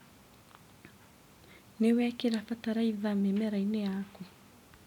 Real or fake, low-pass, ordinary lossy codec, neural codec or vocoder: real; 19.8 kHz; none; none